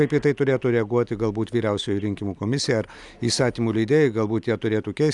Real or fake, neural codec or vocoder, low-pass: real; none; 10.8 kHz